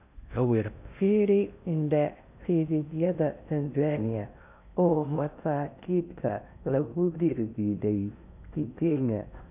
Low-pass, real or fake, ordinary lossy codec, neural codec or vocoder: 3.6 kHz; fake; none; codec, 16 kHz in and 24 kHz out, 0.6 kbps, FocalCodec, streaming, 4096 codes